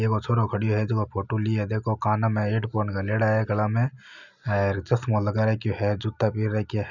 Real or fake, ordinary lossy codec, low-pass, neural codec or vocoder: real; none; 7.2 kHz; none